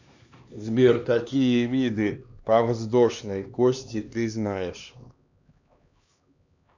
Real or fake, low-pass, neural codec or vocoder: fake; 7.2 kHz; codec, 16 kHz, 2 kbps, X-Codec, HuBERT features, trained on LibriSpeech